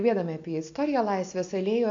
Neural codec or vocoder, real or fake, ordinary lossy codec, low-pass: none; real; AAC, 48 kbps; 7.2 kHz